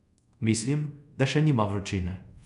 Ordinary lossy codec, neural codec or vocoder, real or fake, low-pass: none; codec, 24 kHz, 0.5 kbps, DualCodec; fake; 10.8 kHz